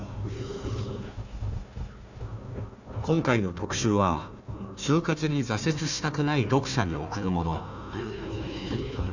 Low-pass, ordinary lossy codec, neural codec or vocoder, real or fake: 7.2 kHz; none; codec, 16 kHz, 1 kbps, FunCodec, trained on Chinese and English, 50 frames a second; fake